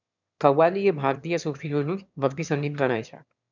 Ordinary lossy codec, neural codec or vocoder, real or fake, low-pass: none; autoencoder, 22.05 kHz, a latent of 192 numbers a frame, VITS, trained on one speaker; fake; 7.2 kHz